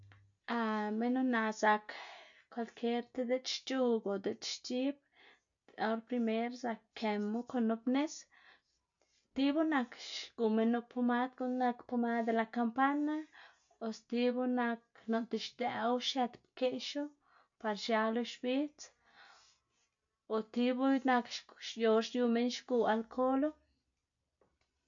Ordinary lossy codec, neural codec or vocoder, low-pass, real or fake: AAC, 64 kbps; none; 7.2 kHz; real